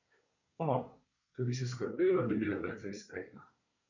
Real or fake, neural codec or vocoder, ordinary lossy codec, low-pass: fake; codec, 24 kHz, 1 kbps, SNAC; none; 7.2 kHz